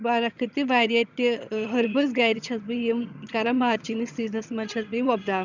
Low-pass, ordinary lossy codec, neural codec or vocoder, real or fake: 7.2 kHz; none; vocoder, 22.05 kHz, 80 mel bands, HiFi-GAN; fake